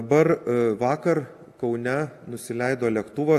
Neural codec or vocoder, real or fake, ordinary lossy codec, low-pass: none; real; AAC, 64 kbps; 14.4 kHz